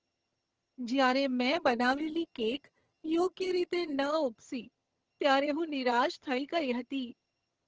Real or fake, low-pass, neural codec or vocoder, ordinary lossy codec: fake; 7.2 kHz; vocoder, 22.05 kHz, 80 mel bands, HiFi-GAN; Opus, 16 kbps